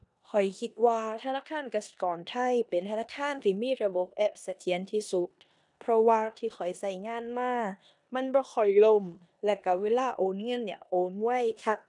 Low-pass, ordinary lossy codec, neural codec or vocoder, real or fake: 10.8 kHz; none; codec, 16 kHz in and 24 kHz out, 0.9 kbps, LongCat-Audio-Codec, four codebook decoder; fake